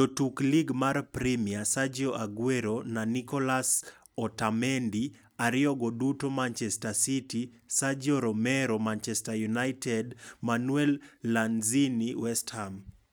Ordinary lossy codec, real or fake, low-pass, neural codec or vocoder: none; real; none; none